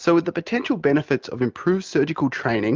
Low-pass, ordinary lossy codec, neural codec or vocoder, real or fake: 7.2 kHz; Opus, 24 kbps; none; real